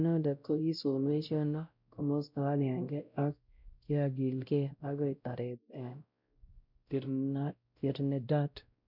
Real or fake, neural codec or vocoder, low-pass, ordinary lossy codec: fake; codec, 16 kHz, 0.5 kbps, X-Codec, WavLM features, trained on Multilingual LibriSpeech; 5.4 kHz; none